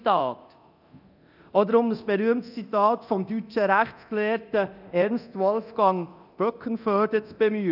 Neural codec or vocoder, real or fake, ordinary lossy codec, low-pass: codec, 24 kHz, 0.9 kbps, DualCodec; fake; none; 5.4 kHz